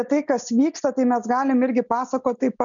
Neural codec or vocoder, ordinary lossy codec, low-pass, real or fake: none; MP3, 96 kbps; 7.2 kHz; real